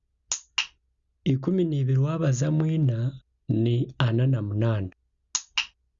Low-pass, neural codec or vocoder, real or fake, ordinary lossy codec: 7.2 kHz; none; real; Opus, 64 kbps